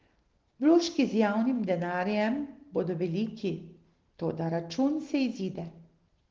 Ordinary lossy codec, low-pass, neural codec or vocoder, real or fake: Opus, 16 kbps; 7.2 kHz; none; real